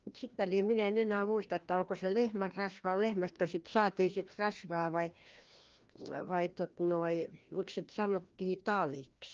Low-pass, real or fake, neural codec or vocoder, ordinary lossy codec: 7.2 kHz; fake; codec, 16 kHz, 1 kbps, FreqCodec, larger model; Opus, 24 kbps